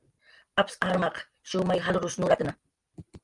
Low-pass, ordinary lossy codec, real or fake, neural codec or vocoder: 10.8 kHz; Opus, 32 kbps; real; none